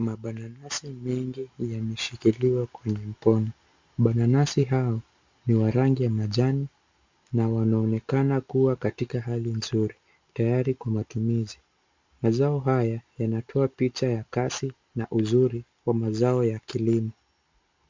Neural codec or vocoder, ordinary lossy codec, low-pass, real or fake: none; MP3, 64 kbps; 7.2 kHz; real